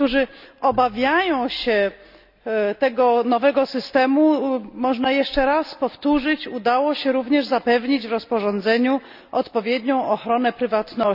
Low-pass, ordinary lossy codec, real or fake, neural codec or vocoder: 5.4 kHz; none; real; none